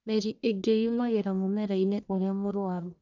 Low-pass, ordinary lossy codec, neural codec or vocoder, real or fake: 7.2 kHz; none; codec, 44.1 kHz, 1.7 kbps, Pupu-Codec; fake